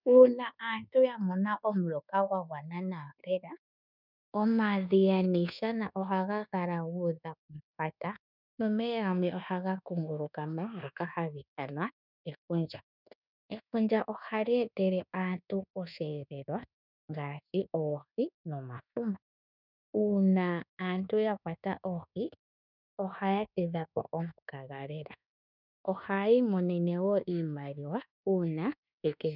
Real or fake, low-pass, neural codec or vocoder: fake; 5.4 kHz; codec, 24 kHz, 1.2 kbps, DualCodec